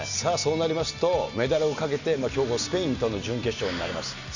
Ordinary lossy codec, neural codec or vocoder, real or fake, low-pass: none; none; real; 7.2 kHz